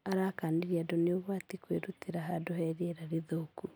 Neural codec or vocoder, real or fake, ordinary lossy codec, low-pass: none; real; none; none